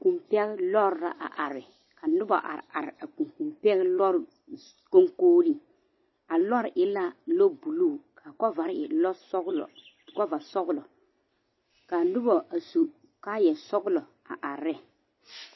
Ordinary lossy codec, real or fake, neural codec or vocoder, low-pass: MP3, 24 kbps; real; none; 7.2 kHz